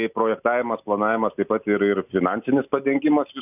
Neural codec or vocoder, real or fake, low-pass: none; real; 3.6 kHz